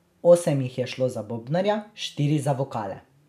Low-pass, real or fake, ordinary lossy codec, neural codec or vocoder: 14.4 kHz; real; none; none